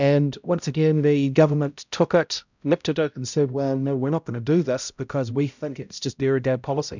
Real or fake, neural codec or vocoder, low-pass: fake; codec, 16 kHz, 0.5 kbps, X-Codec, HuBERT features, trained on balanced general audio; 7.2 kHz